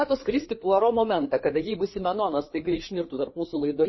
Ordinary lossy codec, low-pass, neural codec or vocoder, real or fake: MP3, 24 kbps; 7.2 kHz; codec, 16 kHz, 4 kbps, FreqCodec, larger model; fake